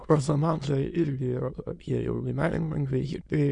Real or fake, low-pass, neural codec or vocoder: fake; 9.9 kHz; autoencoder, 22.05 kHz, a latent of 192 numbers a frame, VITS, trained on many speakers